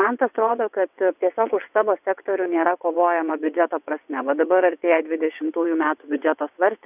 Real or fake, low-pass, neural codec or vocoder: fake; 3.6 kHz; vocoder, 22.05 kHz, 80 mel bands, WaveNeXt